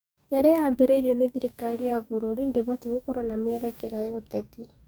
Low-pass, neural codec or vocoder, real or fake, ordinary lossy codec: none; codec, 44.1 kHz, 2.6 kbps, DAC; fake; none